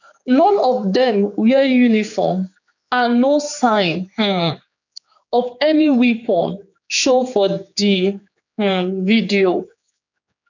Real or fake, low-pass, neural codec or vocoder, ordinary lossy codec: fake; 7.2 kHz; codec, 16 kHz, 4 kbps, X-Codec, HuBERT features, trained on general audio; none